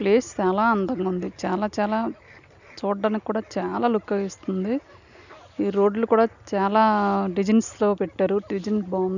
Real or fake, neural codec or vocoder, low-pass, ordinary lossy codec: real; none; 7.2 kHz; none